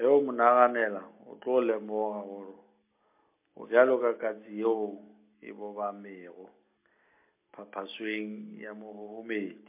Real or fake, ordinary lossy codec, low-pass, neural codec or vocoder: real; MP3, 24 kbps; 3.6 kHz; none